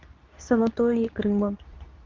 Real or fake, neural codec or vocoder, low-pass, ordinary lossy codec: fake; codec, 24 kHz, 0.9 kbps, WavTokenizer, medium speech release version 2; 7.2 kHz; Opus, 24 kbps